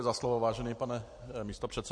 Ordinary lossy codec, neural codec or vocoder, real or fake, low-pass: MP3, 48 kbps; none; real; 9.9 kHz